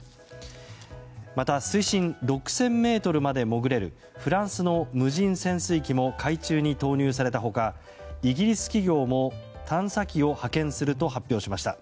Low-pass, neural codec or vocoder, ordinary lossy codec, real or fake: none; none; none; real